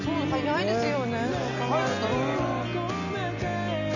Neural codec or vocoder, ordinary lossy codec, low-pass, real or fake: none; none; 7.2 kHz; real